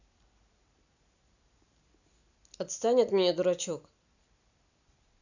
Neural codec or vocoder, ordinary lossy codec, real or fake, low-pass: none; none; real; 7.2 kHz